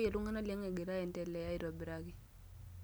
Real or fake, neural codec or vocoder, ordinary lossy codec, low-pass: real; none; none; none